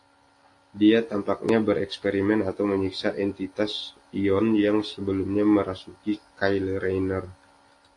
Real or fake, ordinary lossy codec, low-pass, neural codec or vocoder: real; AAC, 48 kbps; 10.8 kHz; none